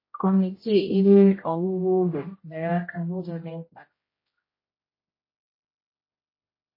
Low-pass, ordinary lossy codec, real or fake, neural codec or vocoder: 5.4 kHz; MP3, 24 kbps; fake; codec, 16 kHz, 0.5 kbps, X-Codec, HuBERT features, trained on general audio